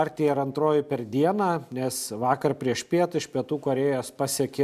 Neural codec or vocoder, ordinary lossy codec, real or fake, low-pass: none; MP3, 96 kbps; real; 14.4 kHz